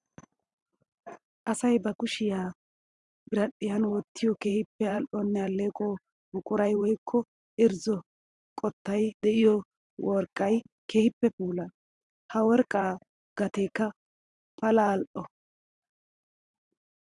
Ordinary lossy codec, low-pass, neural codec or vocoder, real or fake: Opus, 64 kbps; 10.8 kHz; vocoder, 44.1 kHz, 128 mel bands every 512 samples, BigVGAN v2; fake